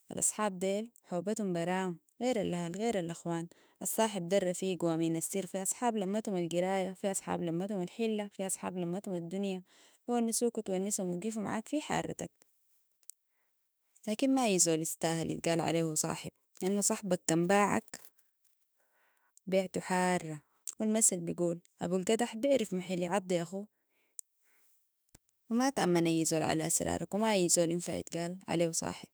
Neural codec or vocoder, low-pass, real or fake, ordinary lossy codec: autoencoder, 48 kHz, 32 numbers a frame, DAC-VAE, trained on Japanese speech; none; fake; none